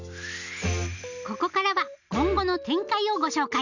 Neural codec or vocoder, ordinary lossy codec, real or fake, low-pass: none; none; real; 7.2 kHz